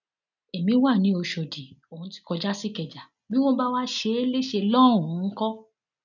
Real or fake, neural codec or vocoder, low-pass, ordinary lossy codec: real; none; 7.2 kHz; none